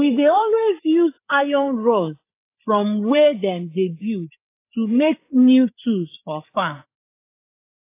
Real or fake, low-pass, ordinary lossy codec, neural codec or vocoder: fake; 3.6 kHz; AAC, 24 kbps; codec, 16 kHz, 8 kbps, FreqCodec, larger model